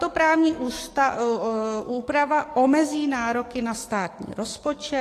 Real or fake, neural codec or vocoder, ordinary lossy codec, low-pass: fake; codec, 44.1 kHz, 7.8 kbps, DAC; AAC, 48 kbps; 14.4 kHz